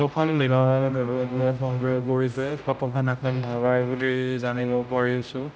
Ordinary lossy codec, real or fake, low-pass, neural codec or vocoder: none; fake; none; codec, 16 kHz, 0.5 kbps, X-Codec, HuBERT features, trained on general audio